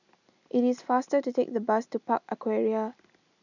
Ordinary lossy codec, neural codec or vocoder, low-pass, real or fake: none; none; 7.2 kHz; real